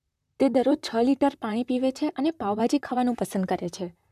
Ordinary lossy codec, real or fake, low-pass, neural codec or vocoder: none; fake; 14.4 kHz; vocoder, 44.1 kHz, 128 mel bands, Pupu-Vocoder